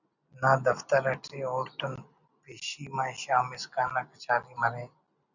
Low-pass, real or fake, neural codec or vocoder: 7.2 kHz; real; none